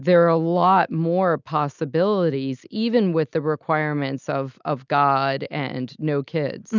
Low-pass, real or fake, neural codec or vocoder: 7.2 kHz; real; none